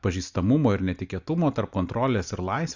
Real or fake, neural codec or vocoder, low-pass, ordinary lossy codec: real; none; 7.2 kHz; Opus, 64 kbps